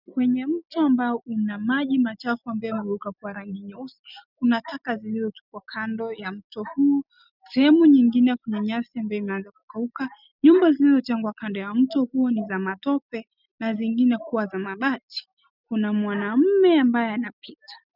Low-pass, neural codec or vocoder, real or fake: 5.4 kHz; none; real